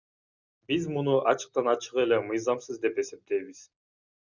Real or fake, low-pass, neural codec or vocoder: real; 7.2 kHz; none